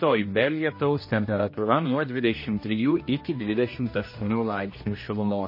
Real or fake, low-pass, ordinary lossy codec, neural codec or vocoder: fake; 5.4 kHz; MP3, 24 kbps; codec, 16 kHz, 1 kbps, X-Codec, HuBERT features, trained on general audio